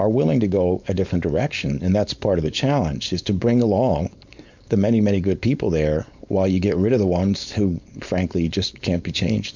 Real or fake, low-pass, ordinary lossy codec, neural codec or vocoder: fake; 7.2 kHz; MP3, 64 kbps; codec, 16 kHz, 4.8 kbps, FACodec